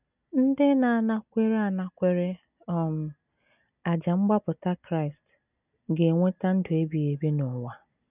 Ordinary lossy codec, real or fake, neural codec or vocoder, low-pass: none; real; none; 3.6 kHz